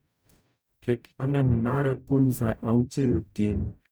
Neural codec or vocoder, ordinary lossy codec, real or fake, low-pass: codec, 44.1 kHz, 0.9 kbps, DAC; none; fake; none